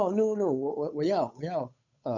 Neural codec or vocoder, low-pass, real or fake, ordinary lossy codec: codec, 16 kHz, 8 kbps, FunCodec, trained on Chinese and English, 25 frames a second; 7.2 kHz; fake; none